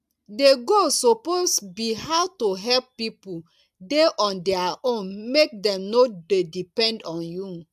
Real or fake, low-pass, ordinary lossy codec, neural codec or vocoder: real; 14.4 kHz; AAC, 96 kbps; none